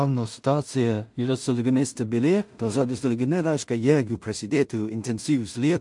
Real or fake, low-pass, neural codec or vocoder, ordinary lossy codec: fake; 10.8 kHz; codec, 16 kHz in and 24 kHz out, 0.4 kbps, LongCat-Audio-Codec, two codebook decoder; MP3, 64 kbps